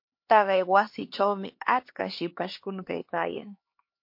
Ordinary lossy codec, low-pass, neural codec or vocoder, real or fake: MP3, 32 kbps; 5.4 kHz; codec, 16 kHz, 2 kbps, X-Codec, HuBERT features, trained on LibriSpeech; fake